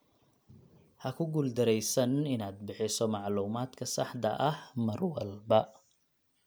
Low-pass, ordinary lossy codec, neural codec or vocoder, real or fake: none; none; none; real